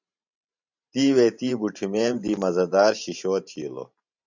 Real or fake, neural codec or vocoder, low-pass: fake; vocoder, 44.1 kHz, 128 mel bands every 512 samples, BigVGAN v2; 7.2 kHz